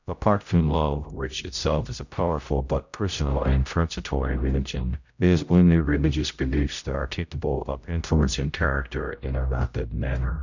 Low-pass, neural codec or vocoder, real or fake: 7.2 kHz; codec, 16 kHz, 0.5 kbps, X-Codec, HuBERT features, trained on general audio; fake